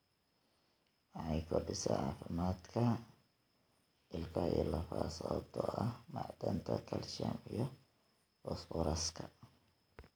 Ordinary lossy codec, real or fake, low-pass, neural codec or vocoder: none; real; none; none